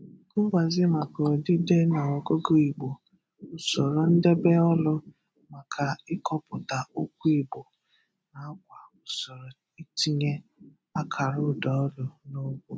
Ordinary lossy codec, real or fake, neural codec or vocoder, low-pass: none; real; none; none